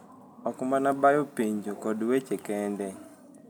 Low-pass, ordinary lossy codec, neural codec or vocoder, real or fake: none; none; none; real